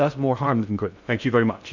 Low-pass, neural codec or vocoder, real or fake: 7.2 kHz; codec, 16 kHz in and 24 kHz out, 0.6 kbps, FocalCodec, streaming, 2048 codes; fake